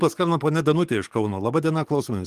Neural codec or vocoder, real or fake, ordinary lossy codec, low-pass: codec, 44.1 kHz, 7.8 kbps, DAC; fake; Opus, 24 kbps; 14.4 kHz